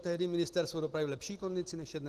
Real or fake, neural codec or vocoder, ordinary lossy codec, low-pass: real; none; Opus, 16 kbps; 14.4 kHz